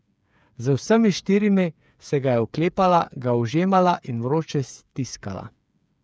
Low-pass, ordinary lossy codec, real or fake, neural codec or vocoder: none; none; fake; codec, 16 kHz, 8 kbps, FreqCodec, smaller model